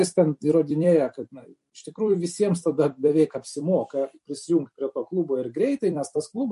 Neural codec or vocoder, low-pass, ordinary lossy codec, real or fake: vocoder, 44.1 kHz, 128 mel bands every 256 samples, BigVGAN v2; 14.4 kHz; MP3, 48 kbps; fake